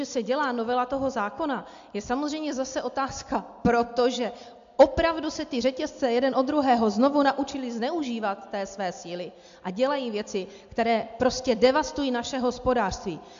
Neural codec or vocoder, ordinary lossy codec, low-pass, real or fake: none; AAC, 64 kbps; 7.2 kHz; real